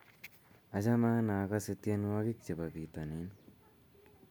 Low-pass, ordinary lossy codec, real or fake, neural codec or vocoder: none; none; real; none